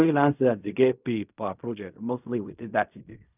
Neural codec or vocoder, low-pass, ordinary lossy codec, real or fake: codec, 16 kHz in and 24 kHz out, 0.4 kbps, LongCat-Audio-Codec, fine tuned four codebook decoder; 3.6 kHz; none; fake